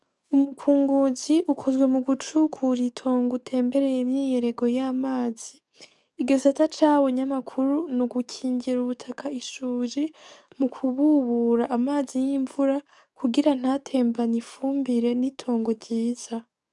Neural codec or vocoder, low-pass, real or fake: codec, 44.1 kHz, 7.8 kbps, DAC; 10.8 kHz; fake